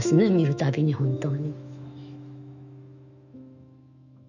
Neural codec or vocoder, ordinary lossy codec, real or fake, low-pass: autoencoder, 48 kHz, 128 numbers a frame, DAC-VAE, trained on Japanese speech; none; fake; 7.2 kHz